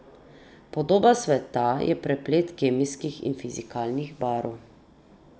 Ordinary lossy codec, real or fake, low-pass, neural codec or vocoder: none; real; none; none